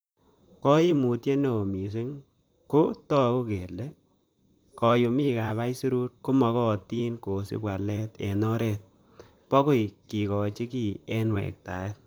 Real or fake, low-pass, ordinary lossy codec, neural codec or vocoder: fake; none; none; vocoder, 44.1 kHz, 128 mel bands, Pupu-Vocoder